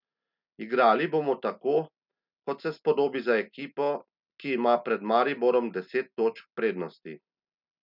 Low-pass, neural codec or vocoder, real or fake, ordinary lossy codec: 5.4 kHz; none; real; none